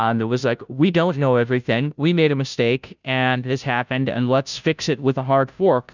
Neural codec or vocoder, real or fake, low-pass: codec, 16 kHz, 0.5 kbps, FunCodec, trained on Chinese and English, 25 frames a second; fake; 7.2 kHz